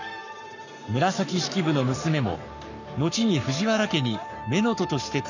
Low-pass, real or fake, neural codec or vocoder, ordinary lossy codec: 7.2 kHz; fake; codec, 44.1 kHz, 7.8 kbps, Pupu-Codec; none